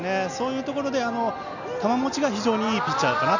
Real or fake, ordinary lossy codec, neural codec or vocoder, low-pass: real; none; none; 7.2 kHz